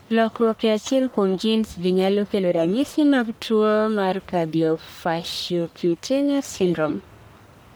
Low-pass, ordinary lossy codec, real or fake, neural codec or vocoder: none; none; fake; codec, 44.1 kHz, 1.7 kbps, Pupu-Codec